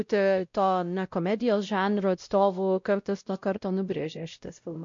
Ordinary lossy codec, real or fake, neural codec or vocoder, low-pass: MP3, 48 kbps; fake; codec, 16 kHz, 0.5 kbps, X-Codec, WavLM features, trained on Multilingual LibriSpeech; 7.2 kHz